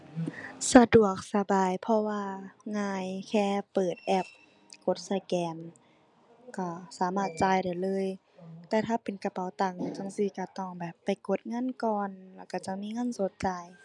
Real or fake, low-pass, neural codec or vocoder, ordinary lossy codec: real; 10.8 kHz; none; none